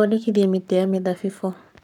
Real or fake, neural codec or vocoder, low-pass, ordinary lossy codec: fake; codec, 44.1 kHz, 7.8 kbps, Pupu-Codec; 19.8 kHz; none